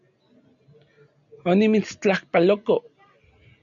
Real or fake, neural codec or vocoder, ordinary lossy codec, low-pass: real; none; MP3, 96 kbps; 7.2 kHz